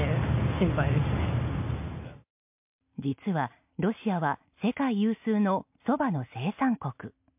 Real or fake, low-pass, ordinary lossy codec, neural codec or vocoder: real; 3.6 kHz; MP3, 32 kbps; none